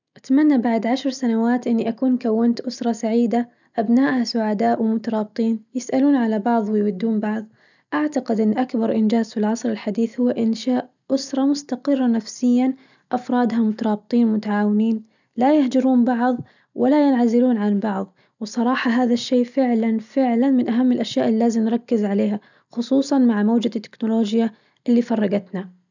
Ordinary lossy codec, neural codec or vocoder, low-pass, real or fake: none; none; 7.2 kHz; real